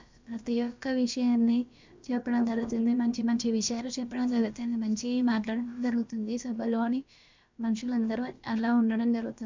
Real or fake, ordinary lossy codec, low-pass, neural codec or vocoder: fake; MP3, 64 kbps; 7.2 kHz; codec, 16 kHz, about 1 kbps, DyCAST, with the encoder's durations